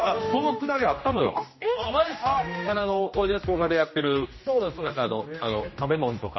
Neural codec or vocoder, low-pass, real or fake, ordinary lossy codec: codec, 16 kHz, 1 kbps, X-Codec, HuBERT features, trained on general audio; 7.2 kHz; fake; MP3, 24 kbps